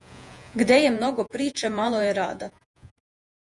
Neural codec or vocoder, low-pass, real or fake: vocoder, 48 kHz, 128 mel bands, Vocos; 10.8 kHz; fake